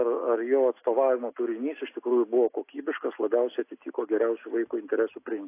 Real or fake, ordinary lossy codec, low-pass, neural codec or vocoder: real; AAC, 24 kbps; 3.6 kHz; none